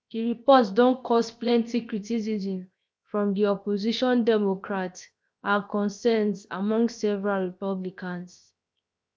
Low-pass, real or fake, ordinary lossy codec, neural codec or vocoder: none; fake; none; codec, 16 kHz, 0.7 kbps, FocalCodec